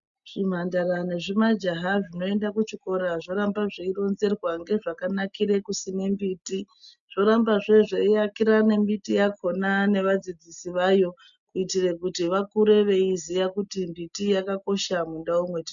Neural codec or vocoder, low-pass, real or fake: none; 7.2 kHz; real